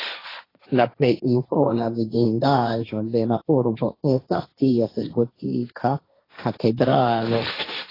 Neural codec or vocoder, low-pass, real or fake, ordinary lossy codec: codec, 16 kHz, 1.1 kbps, Voila-Tokenizer; 5.4 kHz; fake; AAC, 24 kbps